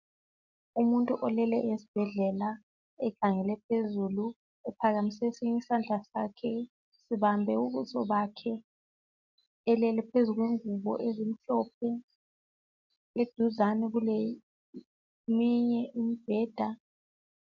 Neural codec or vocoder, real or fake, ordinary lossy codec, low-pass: none; real; MP3, 64 kbps; 7.2 kHz